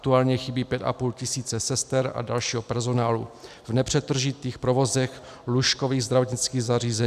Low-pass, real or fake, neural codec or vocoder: 14.4 kHz; real; none